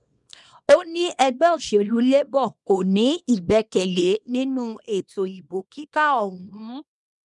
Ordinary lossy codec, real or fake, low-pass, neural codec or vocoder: none; fake; 10.8 kHz; codec, 24 kHz, 0.9 kbps, WavTokenizer, small release